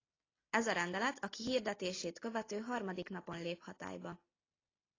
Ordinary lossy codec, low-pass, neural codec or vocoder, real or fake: AAC, 32 kbps; 7.2 kHz; none; real